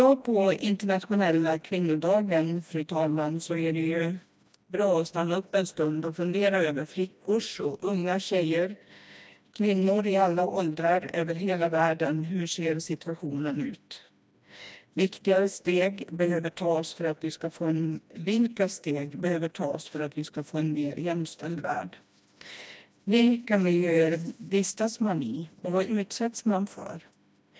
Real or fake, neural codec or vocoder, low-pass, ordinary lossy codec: fake; codec, 16 kHz, 1 kbps, FreqCodec, smaller model; none; none